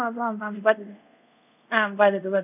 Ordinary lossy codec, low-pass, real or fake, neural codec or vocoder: none; 3.6 kHz; fake; codec, 24 kHz, 0.5 kbps, DualCodec